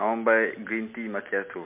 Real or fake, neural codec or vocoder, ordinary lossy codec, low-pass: real; none; MP3, 32 kbps; 3.6 kHz